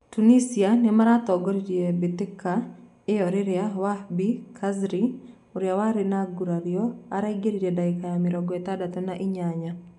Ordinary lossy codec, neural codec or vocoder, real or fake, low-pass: none; none; real; 10.8 kHz